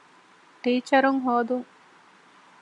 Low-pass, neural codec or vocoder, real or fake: 10.8 kHz; none; real